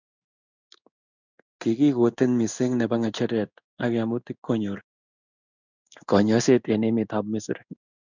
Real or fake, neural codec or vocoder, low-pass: fake; codec, 16 kHz in and 24 kHz out, 1 kbps, XY-Tokenizer; 7.2 kHz